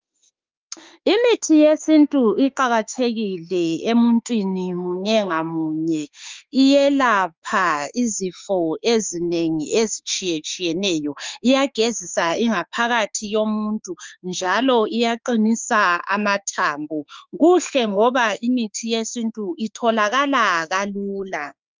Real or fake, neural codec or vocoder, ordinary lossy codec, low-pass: fake; autoencoder, 48 kHz, 32 numbers a frame, DAC-VAE, trained on Japanese speech; Opus, 32 kbps; 7.2 kHz